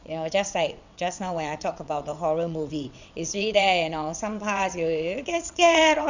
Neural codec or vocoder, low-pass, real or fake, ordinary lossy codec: vocoder, 22.05 kHz, 80 mel bands, WaveNeXt; 7.2 kHz; fake; none